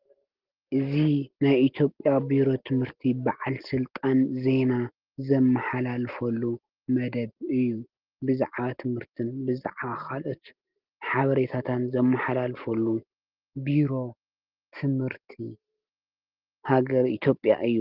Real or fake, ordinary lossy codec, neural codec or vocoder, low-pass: real; Opus, 16 kbps; none; 5.4 kHz